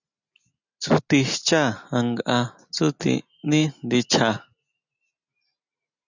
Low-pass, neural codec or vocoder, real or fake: 7.2 kHz; none; real